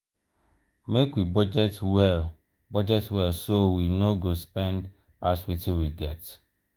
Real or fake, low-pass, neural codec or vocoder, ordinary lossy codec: fake; 19.8 kHz; autoencoder, 48 kHz, 32 numbers a frame, DAC-VAE, trained on Japanese speech; Opus, 32 kbps